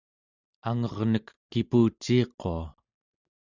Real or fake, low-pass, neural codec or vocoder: real; 7.2 kHz; none